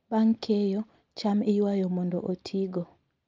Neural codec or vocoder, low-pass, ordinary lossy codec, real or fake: none; 7.2 kHz; Opus, 24 kbps; real